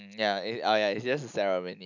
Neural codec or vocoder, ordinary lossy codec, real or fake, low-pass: none; none; real; 7.2 kHz